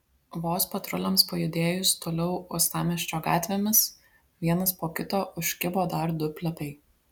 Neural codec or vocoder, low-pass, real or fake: none; 19.8 kHz; real